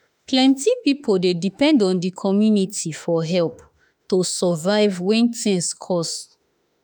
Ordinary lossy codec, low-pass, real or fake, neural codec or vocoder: none; 19.8 kHz; fake; autoencoder, 48 kHz, 32 numbers a frame, DAC-VAE, trained on Japanese speech